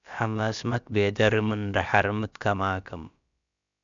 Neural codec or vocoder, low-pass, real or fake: codec, 16 kHz, about 1 kbps, DyCAST, with the encoder's durations; 7.2 kHz; fake